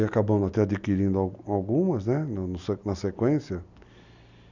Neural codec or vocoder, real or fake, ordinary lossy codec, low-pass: none; real; none; 7.2 kHz